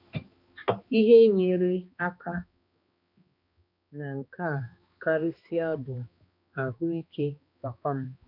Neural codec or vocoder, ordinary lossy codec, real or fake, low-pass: codec, 16 kHz, 2 kbps, X-Codec, HuBERT features, trained on balanced general audio; none; fake; 5.4 kHz